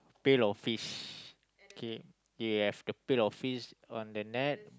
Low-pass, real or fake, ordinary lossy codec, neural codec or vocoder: none; real; none; none